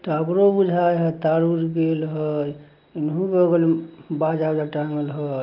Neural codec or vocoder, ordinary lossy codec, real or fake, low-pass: none; Opus, 32 kbps; real; 5.4 kHz